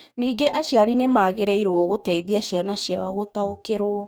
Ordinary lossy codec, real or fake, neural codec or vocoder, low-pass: none; fake; codec, 44.1 kHz, 2.6 kbps, DAC; none